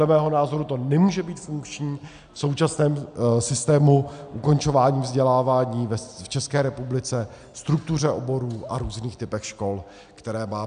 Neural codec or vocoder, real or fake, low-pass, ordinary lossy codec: none; real; 9.9 kHz; MP3, 96 kbps